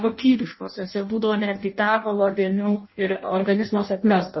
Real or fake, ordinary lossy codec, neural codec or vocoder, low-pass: fake; MP3, 24 kbps; codec, 16 kHz in and 24 kHz out, 0.6 kbps, FireRedTTS-2 codec; 7.2 kHz